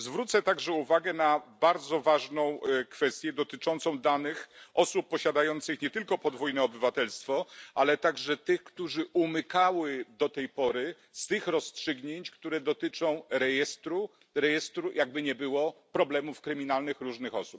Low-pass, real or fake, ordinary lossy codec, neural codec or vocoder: none; real; none; none